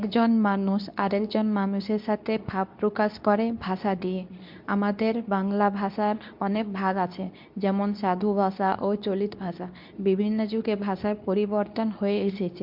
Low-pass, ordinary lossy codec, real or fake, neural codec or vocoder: 5.4 kHz; MP3, 48 kbps; fake; codec, 24 kHz, 0.9 kbps, WavTokenizer, medium speech release version 2